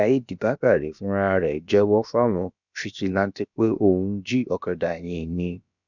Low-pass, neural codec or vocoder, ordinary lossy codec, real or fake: 7.2 kHz; codec, 16 kHz, about 1 kbps, DyCAST, with the encoder's durations; none; fake